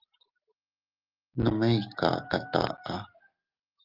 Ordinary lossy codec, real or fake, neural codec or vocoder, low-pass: Opus, 32 kbps; real; none; 5.4 kHz